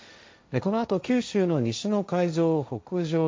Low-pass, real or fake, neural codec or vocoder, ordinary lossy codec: none; fake; codec, 16 kHz, 1.1 kbps, Voila-Tokenizer; none